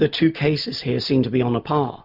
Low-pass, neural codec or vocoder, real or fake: 5.4 kHz; none; real